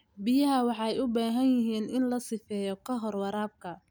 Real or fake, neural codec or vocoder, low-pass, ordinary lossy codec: real; none; none; none